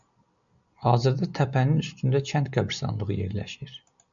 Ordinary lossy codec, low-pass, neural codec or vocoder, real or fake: MP3, 96 kbps; 7.2 kHz; none; real